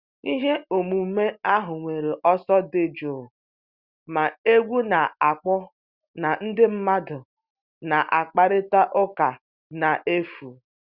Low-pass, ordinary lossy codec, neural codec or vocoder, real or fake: 5.4 kHz; Opus, 64 kbps; none; real